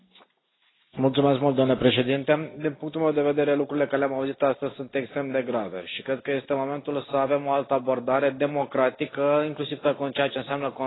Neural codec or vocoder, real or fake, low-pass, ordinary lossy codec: none; real; 7.2 kHz; AAC, 16 kbps